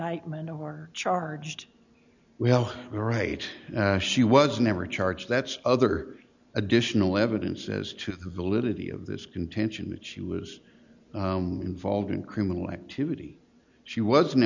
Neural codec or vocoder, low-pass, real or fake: none; 7.2 kHz; real